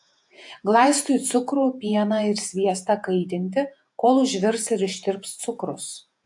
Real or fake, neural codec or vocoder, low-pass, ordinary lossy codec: fake; vocoder, 48 kHz, 128 mel bands, Vocos; 10.8 kHz; AAC, 64 kbps